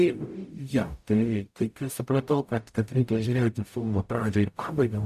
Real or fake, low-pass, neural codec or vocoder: fake; 14.4 kHz; codec, 44.1 kHz, 0.9 kbps, DAC